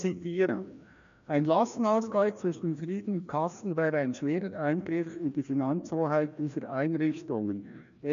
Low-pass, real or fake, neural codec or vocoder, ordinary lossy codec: 7.2 kHz; fake; codec, 16 kHz, 1 kbps, FreqCodec, larger model; AAC, 96 kbps